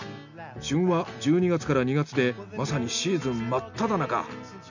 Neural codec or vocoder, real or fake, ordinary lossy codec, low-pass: none; real; none; 7.2 kHz